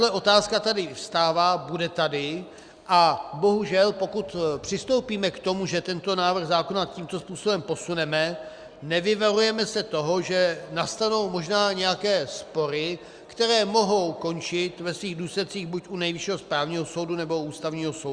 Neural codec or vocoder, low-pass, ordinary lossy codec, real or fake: none; 9.9 kHz; Opus, 64 kbps; real